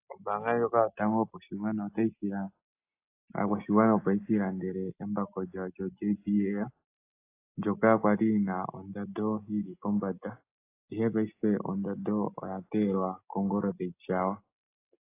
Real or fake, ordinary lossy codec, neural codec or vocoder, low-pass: real; AAC, 24 kbps; none; 3.6 kHz